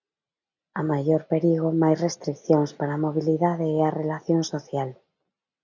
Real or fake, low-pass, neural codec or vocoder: real; 7.2 kHz; none